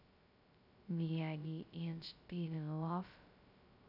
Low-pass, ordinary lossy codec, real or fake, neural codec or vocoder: 5.4 kHz; none; fake; codec, 16 kHz, 0.2 kbps, FocalCodec